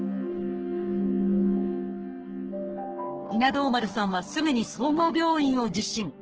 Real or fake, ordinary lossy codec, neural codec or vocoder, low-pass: fake; Opus, 16 kbps; codec, 44.1 kHz, 3.4 kbps, Pupu-Codec; 7.2 kHz